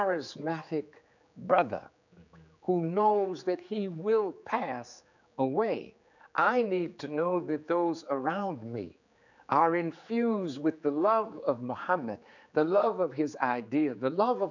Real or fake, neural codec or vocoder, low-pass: fake; codec, 16 kHz, 4 kbps, X-Codec, HuBERT features, trained on general audio; 7.2 kHz